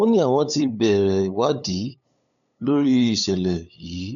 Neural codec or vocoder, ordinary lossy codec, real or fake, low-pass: codec, 16 kHz, 8 kbps, FunCodec, trained on LibriTTS, 25 frames a second; none; fake; 7.2 kHz